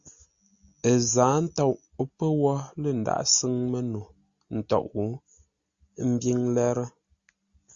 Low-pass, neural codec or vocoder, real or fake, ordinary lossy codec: 7.2 kHz; none; real; Opus, 64 kbps